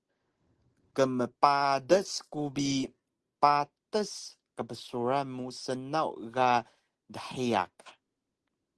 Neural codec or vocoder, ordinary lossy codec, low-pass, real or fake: none; Opus, 16 kbps; 10.8 kHz; real